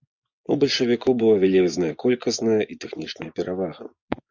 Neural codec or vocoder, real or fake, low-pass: vocoder, 24 kHz, 100 mel bands, Vocos; fake; 7.2 kHz